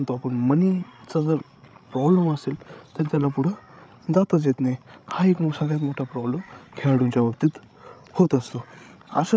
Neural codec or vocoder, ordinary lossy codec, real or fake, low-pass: codec, 16 kHz, 16 kbps, FreqCodec, larger model; none; fake; none